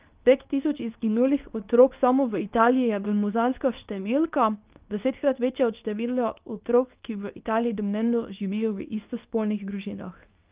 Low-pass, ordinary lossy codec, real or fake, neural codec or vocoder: 3.6 kHz; Opus, 32 kbps; fake; codec, 24 kHz, 0.9 kbps, WavTokenizer, medium speech release version 2